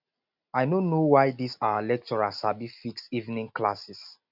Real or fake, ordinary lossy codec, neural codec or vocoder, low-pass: real; none; none; 5.4 kHz